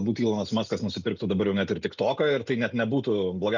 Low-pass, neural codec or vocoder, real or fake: 7.2 kHz; none; real